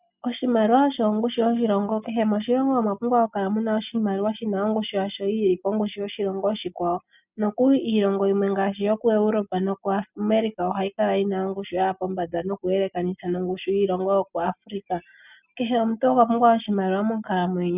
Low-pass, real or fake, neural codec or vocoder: 3.6 kHz; real; none